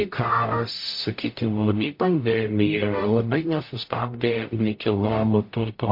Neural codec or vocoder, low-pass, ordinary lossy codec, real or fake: codec, 44.1 kHz, 0.9 kbps, DAC; 5.4 kHz; MP3, 32 kbps; fake